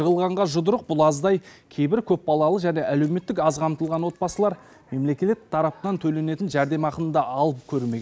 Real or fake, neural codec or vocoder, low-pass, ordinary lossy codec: real; none; none; none